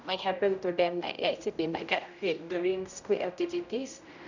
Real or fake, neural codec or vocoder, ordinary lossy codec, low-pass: fake; codec, 16 kHz, 0.5 kbps, X-Codec, HuBERT features, trained on general audio; none; 7.2 kHz